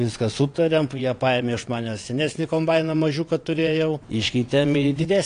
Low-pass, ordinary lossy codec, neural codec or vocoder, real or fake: 9.9 kHz; AAC, 48 kbps; vocoder, 22.05 kHz, 80 mel bands, WaveNeXt; fake